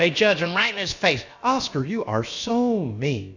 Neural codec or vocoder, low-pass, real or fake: codec, 16 kHz, about 1 kbps, DyCAST, with the encoder's durations; 7.2 kHz; fake